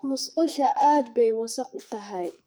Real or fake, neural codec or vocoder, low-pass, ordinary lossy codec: fake; codec, 44.1 kHz, 2.6 kbps, SNAC; none; none